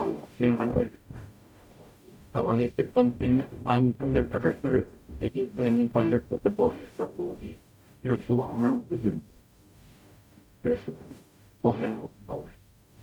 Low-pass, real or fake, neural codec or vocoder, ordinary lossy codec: 19.8 kHz; fake; codec, 44.1 kHz, 0.9 kbps, DAC; none